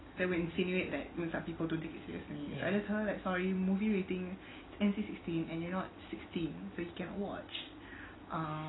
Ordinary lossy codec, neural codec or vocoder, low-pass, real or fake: AAC, 16 kbps; none; 7.2 kHz; real